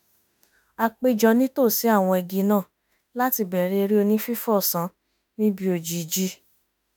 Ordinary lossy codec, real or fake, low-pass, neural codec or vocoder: none; fake; none; autoencoder, 48 kHz, 32 numbers a frame, DAC-VAE, trained on Japanese speech